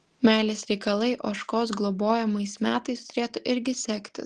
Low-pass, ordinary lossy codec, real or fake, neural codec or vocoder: 10.8 kHz; Opus, 16 kbps; real; none